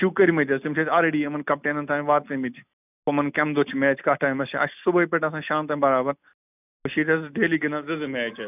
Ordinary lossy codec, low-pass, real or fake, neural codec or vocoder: none; 3.6 kHz; real; none